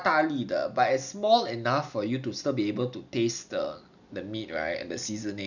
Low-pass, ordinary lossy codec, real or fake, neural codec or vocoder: 7.2 kHz; none; real; none